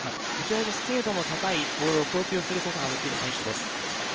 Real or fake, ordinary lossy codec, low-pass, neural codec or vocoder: fake; Opus, 24 kbps; 7.2 kHz; codec, 16 kHz in and 24 kHz out, 1 kbps, XY-Tokenizer